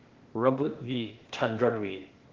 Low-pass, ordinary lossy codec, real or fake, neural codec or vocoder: 7.2 kHz; Opus, 16 kbps; fake; codec, 16 kHz, 0.8 kbps, ZipCodec